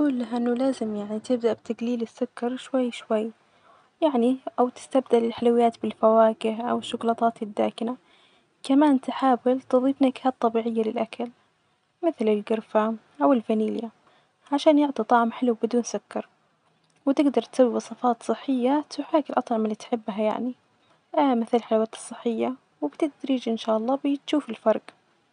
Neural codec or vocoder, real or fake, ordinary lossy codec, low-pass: none; real; none; 9.9 kHz